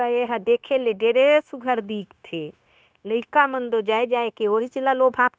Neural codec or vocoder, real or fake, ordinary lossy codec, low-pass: codec, 16 kHz, 0.9 kbps, LongCat-Audio-Codec; fake; none; none